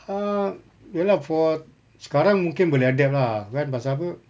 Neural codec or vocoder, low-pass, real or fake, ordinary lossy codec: none; none; real; none